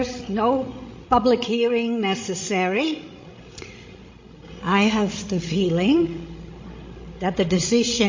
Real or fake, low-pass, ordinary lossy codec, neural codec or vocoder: fake; 7.2 kHz; MP3, 32 kbps; codec, 16 kHz, 16 kbps, FreqCodec, larger model